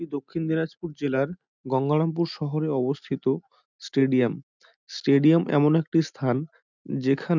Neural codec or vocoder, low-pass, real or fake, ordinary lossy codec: none; 7.2 kHz; real; none